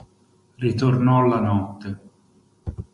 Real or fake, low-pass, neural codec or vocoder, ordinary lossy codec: real; 14.4 kHz; none; MP3, 48 kbps